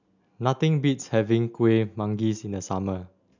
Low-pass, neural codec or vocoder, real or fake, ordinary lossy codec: 7.2 kHz; none; real; none